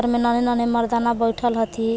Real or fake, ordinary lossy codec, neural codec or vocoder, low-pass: real; none; none; none